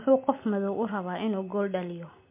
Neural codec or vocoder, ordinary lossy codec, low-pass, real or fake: none; MP3, 24 kbps; 3.6 kHz; real